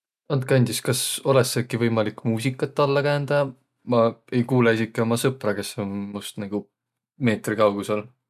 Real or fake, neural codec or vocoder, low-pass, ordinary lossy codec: real; none; 14.4 kHz; none